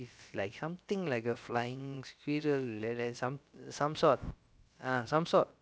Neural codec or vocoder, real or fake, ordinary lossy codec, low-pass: codec, 16 kHz, about 1 kbps, DyCAST, with the encoder's durations; fake; none; none